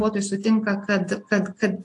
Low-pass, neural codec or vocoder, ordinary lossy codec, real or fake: 10.8 kHz; none; AAC, 64 kbps; real